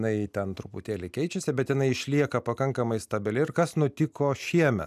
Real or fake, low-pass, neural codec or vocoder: real; 14.4 kHz; none